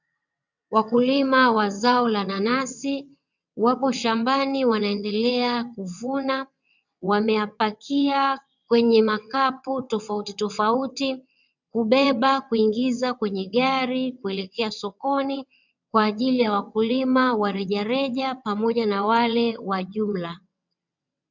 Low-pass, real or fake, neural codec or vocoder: 7.2 kHz; fake; vocoder, 22.05 kHz, 80 mel bands, WaveNeXt